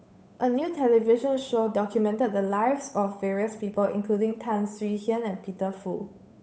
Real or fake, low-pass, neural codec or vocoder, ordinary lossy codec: fake; none; codec, 16 kHz, 8 kbps, FunCodec, trained on Chinese and English, 25 frames a second; none